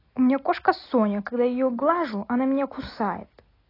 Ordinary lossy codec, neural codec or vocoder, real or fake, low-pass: AAC, 24 kbps; none; real; 5.4 kHz